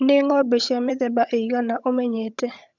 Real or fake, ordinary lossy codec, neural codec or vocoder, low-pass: fake; none; vocoder, 22.05 kHz, 80 mel bands, HiFi-GAN; 7.2 kHz